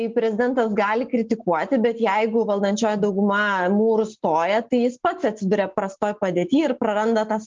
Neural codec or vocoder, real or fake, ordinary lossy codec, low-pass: none; real; Opus, 24 kbps; 7.2 kHz